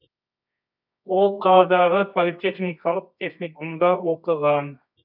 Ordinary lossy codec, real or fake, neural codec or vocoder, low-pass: none; fake; codec, 24 kHz, 0.9 kbps, WavTokenizer, medium music audio release; 5.4 kHz